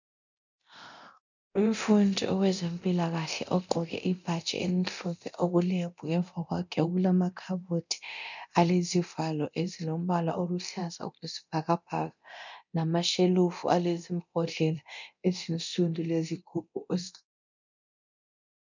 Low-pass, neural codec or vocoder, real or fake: 7.2 kHz; codec, 24 kHz, 0.9 kbps, DualCodec; fake